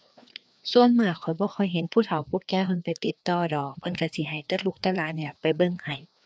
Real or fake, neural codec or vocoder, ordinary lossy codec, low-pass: fake; codec, 16 kHz, 2 kbps, FreqCodec, larger model; none; none